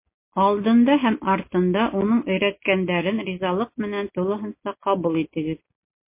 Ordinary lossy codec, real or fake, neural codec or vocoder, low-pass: MP3, 24 kbps; real; none; 3.6 kHz